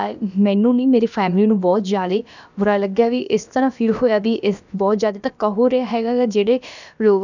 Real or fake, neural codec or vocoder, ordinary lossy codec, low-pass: fake; codec, 16 kHz, about 1 kbps, DyCAST, with the encoder's durations; none; 7.2 kHz